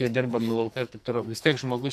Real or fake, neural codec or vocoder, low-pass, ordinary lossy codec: fake; codec, 44.1 kHz, 2.6 kbps, SNAC; 14.4 kHz; AAC, 96 kbps